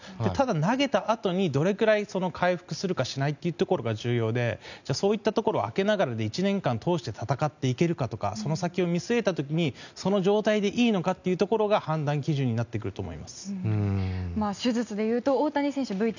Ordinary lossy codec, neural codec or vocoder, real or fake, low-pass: none; none; real; 7.2 kHz